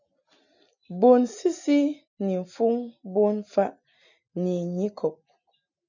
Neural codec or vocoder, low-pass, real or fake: none; 7.2 kHz; real